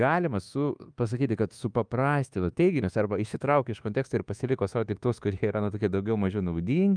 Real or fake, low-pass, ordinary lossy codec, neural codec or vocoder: fake; 9.9 kHz; Opus, 32 kbps; codec, 24 kHz, 1.2 kbps, DualCodec